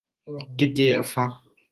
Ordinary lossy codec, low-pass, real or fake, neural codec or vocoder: Opus, 32 kbps; 14.4 kHz; fake; codec, 32 kHz, 1.9 kbps, SNAC